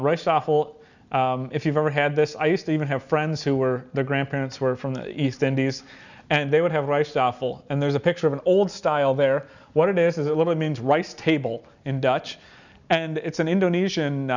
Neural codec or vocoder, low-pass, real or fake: none; 7.2 kHz; real